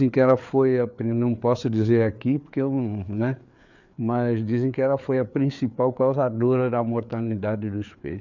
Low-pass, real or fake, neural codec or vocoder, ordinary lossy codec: 7.2 kHz; fake; codec, 16 kHz, 4 kbps, FreqCodec, larger model; none